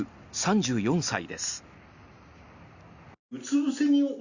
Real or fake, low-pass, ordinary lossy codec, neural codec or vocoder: real; 7.2 kHz; Opus, 64 kbps; none